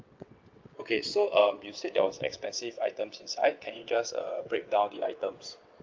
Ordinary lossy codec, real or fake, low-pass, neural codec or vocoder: Opus, 24 kbps; fake; 7.2 kHz; vocoder, 22.05 kHz, 80 mel bands, WaveNeXt